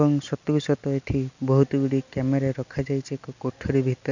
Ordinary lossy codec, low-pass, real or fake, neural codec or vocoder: none; 7.2 kHz; real; none